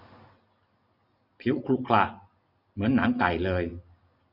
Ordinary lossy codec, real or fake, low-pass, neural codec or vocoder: none; real; 5.4 kHz; none